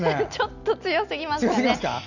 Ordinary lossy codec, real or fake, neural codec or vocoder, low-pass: none; real; none; 7.2 kHz